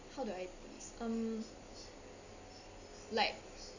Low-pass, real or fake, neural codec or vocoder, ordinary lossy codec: 7.2 kHz; real; none; none